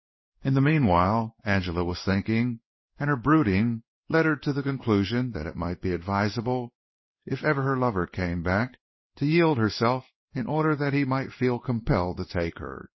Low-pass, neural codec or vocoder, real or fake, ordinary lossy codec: 7.2 kHz; codec, 16 kHz in and 24 kHz out, 1 kbps, XY-Tokenizer; fake; MP3, 24 kbps